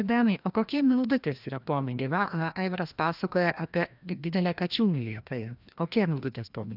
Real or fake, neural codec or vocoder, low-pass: fake; codec, 16 kHz, 1 kbps, FreqCodec, larger model; 5.4 kHz